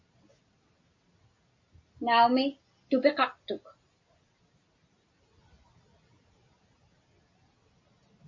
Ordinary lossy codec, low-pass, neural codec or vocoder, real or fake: MP3, 48 kbps; 7.2 kHz; none; real